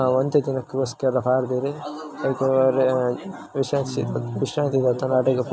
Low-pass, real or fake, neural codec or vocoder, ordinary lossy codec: none; real; none; none